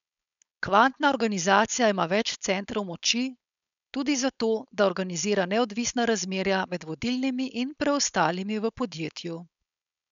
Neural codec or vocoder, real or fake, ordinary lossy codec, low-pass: codec, 16 kHz, 4.8 kbps, FACodec; fake; none; 7.2 kHz